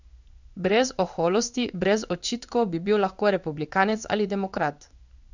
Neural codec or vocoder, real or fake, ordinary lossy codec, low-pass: codec, 16 kHz in and 24 kHz out, 1 kbps, XY-Tokenizer; fake; none; 7.2 kHz